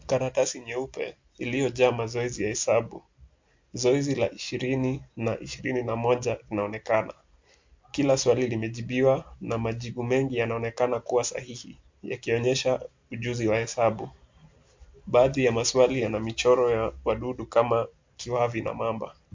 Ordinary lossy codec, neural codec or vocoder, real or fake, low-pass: MP3, 48 kbps; none; real; 7.2 kHz